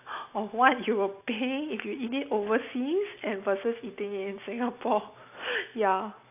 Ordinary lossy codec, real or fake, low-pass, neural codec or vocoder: AAC, 32 kbps; real; 3.6 kHz; none